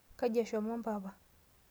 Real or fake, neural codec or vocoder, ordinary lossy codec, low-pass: real; none; none; none